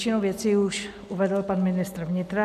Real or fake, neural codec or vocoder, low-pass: real; none; 14.4 kHz